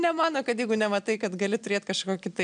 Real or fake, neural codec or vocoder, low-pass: real; none; 9.9 kHz